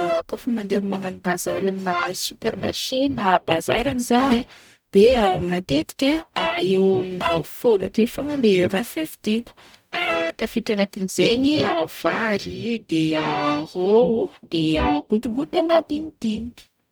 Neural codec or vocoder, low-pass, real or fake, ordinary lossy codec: codec, 44.1 kHz, 0.9 kbps, DAC; none; fake; none